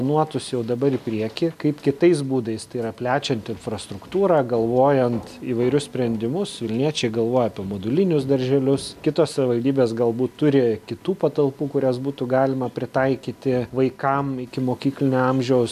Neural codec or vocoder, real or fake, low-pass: none; real; 14.4 kHz